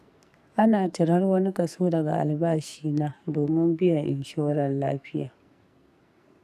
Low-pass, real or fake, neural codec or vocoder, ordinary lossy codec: 14.4 kHz; fake; codec, 44.1 kHz, 2.6 kbps, SNAC; none